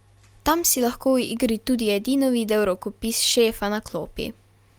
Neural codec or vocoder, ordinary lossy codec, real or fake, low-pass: none; Opus, 32 kbps; real; 19.8 kHz